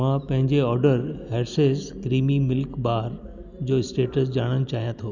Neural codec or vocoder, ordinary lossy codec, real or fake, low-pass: none; none; real; 7.2 kHz